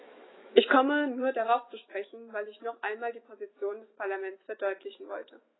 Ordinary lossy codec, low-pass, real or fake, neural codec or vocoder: AAC, 16 kbps; 7.2 kHz; fake; autoencoder, 48 kHz, 128 numbers a frame, DAC-VAE, trained on Japanese speech